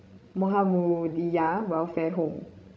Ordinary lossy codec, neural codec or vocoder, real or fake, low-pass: none; codec, 16 kHz, 16 kbps, FreqCodec, larger model; fake; none